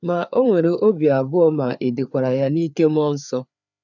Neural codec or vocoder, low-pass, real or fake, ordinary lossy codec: codec, 16 kHz, 4 kbps, FreqCodec, larger model; 7.2 kHz; fake; none